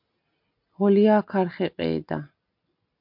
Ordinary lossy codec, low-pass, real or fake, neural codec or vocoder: MP3, 48 kbps; 5.4 kHz; real; none